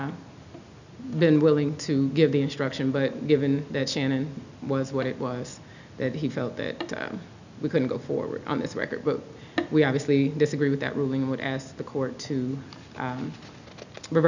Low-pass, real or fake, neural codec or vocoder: 7.2 kHz; real; none